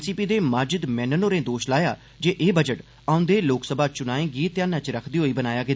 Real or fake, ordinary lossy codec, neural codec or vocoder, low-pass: real; none; none; none